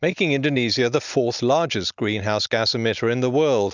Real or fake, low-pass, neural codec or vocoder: real; 7.2 kHz; none